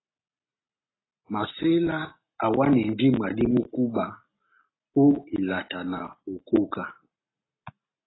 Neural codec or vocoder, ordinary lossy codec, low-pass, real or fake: none; AAC, 16 kbps; 7.2 kHz; real